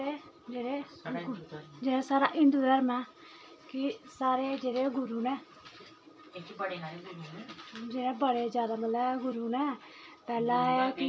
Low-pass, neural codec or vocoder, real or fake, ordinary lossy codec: none; none; real; none